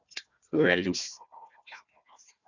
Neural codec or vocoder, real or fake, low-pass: codec, 16 kHz, 1 kbps, FunCodec, trained on Chinese and English, 50 frames a second; fake; 7.2 kHz